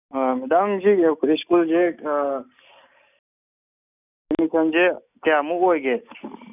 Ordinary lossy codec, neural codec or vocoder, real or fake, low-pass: none; none; real; 3.6 kHz